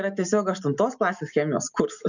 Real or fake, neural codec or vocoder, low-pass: real; none; 7.2 kHz